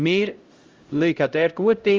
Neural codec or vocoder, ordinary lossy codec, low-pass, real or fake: codec, 16 kHz, 0.5 kbps, X-Codec, HuBERT features, trained on LibriSpeech; Opus, 32 kbps; 7.2 kHz; fake